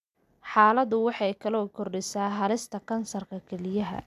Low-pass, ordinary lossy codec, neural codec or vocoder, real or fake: 14.4 kHz; none; none; real